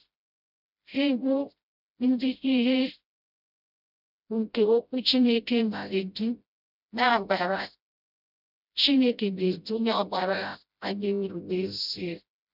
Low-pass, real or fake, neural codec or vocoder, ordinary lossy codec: 5.4 kHz; fake; codec, 16 kHz, 0.5 kbps, FreqCodec, smaller model; none